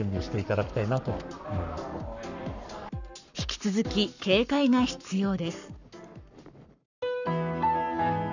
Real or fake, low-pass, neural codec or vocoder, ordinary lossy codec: fake; 7.2 kHz; codec, 44.1 kHz, 7.8 kbps, Pupu-Codec; none